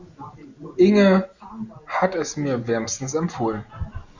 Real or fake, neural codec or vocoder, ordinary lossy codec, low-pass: real; none; Opus, 64 kbps; 7.2 kHz